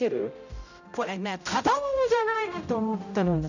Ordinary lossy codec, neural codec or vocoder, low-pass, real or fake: none; codec, 16 kHz, 0.5 kbps, X-Codec, HuBERT features, trained on general audio; 7.2 kHz; fake